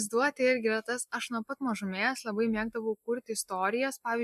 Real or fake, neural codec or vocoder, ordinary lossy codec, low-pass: real; none; MP3, 96 kbps; 14.4 kHz